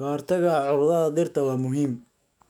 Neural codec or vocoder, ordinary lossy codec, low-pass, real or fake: autoencoder, 48 kHz, 128 numbers a frame, DAC-VAE, trained on Japanese speech; none; 19.8 kHz; fake